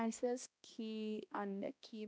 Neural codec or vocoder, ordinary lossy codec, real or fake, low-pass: codec, 16 kHz, 1 kbps, X-Codec, HuBERT features, trained on balanced general audio; none; fake; none